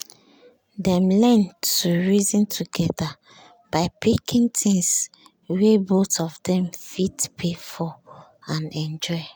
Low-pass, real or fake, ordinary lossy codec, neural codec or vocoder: none; real; none; none